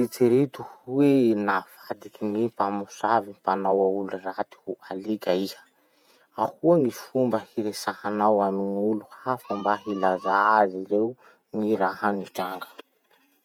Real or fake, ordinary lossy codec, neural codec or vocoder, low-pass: fake; none; vocoder, 48 kHz, 128 mel bands, Vocos; 19.8 kHz